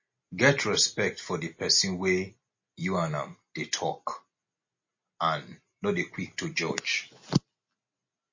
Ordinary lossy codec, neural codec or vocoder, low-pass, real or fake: MP3, 32 kbps; none; 7.2 kHz; real